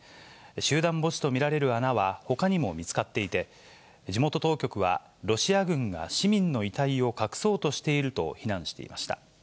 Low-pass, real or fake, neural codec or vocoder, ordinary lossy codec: none; real; none; none